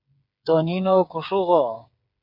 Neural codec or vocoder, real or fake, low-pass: codec, 16 kHz, 8 kbps, FreqCodec, smaller model; fake; 5.4 kHz